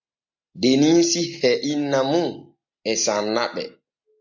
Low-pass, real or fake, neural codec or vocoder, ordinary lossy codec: 7.2 kHz; real; none; MP3, 64 kbps